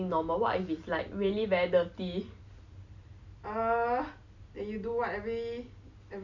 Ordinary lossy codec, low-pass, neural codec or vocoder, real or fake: none; 7.2 kHz; none; real